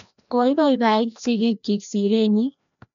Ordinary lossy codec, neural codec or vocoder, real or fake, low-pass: none; codec, 16 kHz, 1 kbps, FreqCodec, larger model; fake; 7.2 kHz